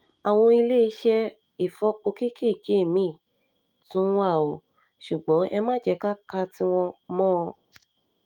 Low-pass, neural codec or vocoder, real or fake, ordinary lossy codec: 19.8 kHz; autoencoder, 48 kHz, 128 numbers a frame, DAC-VAE, trained on Japanese speech; fake; Opus, 32 kbps